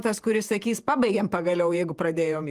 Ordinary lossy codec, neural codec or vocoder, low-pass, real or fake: Opus, 32 kbps; vocoder, 48 kHz, 128 mel bands, Vocos; 14.4 kHz; fake